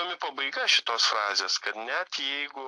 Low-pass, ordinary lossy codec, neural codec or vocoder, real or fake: 10.8 kHz; AAC, 64 kbps; none; real